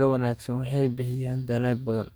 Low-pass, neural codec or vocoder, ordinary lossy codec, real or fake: none; codec, 44.1 kHz, 2.6 kbps, DAC; none; fake